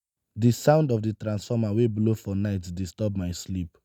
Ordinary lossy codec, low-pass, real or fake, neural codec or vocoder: none; none; real; none